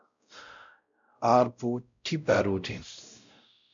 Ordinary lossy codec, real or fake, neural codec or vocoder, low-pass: MP3, 64 kbps; fake; codec, 16 kHz, 0.5 kbps, X-Codec, WavLM features, trained on Multilingual LibriSpeech; 7.2 kHz